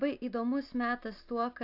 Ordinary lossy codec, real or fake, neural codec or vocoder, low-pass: AAC, 32 kbps; real; none; 5.4 kHz